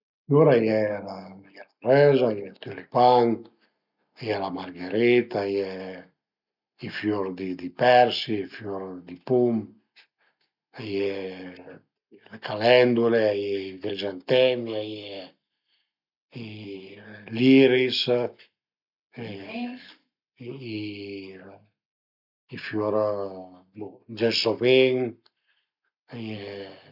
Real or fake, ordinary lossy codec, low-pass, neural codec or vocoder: real; none; 5.4 kHz; none